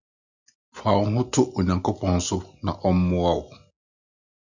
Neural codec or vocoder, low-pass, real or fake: none; 7.2 kHz; real